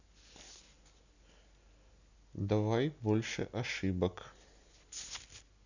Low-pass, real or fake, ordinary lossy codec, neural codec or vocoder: 7.2 kHz; real; none; none